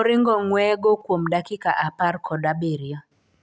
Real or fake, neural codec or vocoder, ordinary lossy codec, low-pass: real; none; none; none